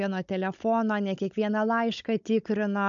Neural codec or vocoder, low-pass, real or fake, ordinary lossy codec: codec, 16 kHz, 16 kbps, FunCodec, trained on Chinese and English, 50 frames a second; 7.2 kHz; fake; MP3, 96 kbps